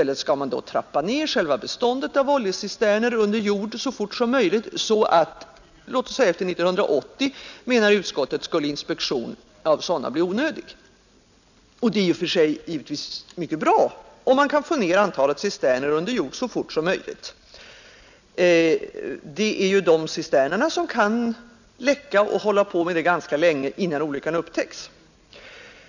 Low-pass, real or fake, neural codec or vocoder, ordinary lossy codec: 7.2 kHz; real; none; none